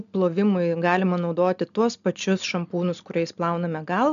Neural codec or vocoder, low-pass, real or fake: none; 7.2 kHz; real